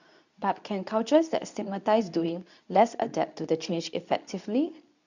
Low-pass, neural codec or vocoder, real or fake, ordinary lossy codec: 7.2 kHz; codec, 24 kHz, 0.9 kbps, WavTokenizer, medium speech release version 2; fake; none